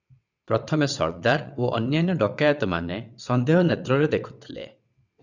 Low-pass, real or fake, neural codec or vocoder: 7.2 kHz; fake; vocoder, 22.05 kHz, 80 mel bands, WaveNeXt